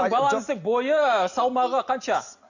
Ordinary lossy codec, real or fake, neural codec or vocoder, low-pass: none; real; none; 7.2 kHz